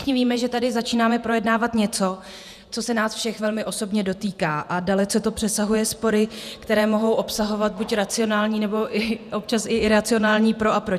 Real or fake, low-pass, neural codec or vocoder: fake; 14.4 kHz; vocoder, 48 kHz, 128 mel bands, Vocos